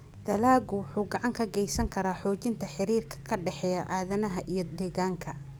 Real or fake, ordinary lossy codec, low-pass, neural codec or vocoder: fake; none; none; codec, 44.1 kHz, 7.8 kbps, DAC